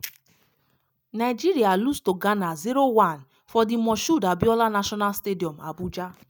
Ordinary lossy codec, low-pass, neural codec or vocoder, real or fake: none; none; none; real